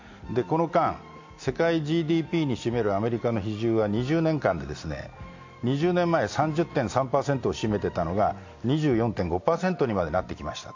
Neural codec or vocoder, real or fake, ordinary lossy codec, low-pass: none; real; AAC, 48 kbps; 7.2 kHz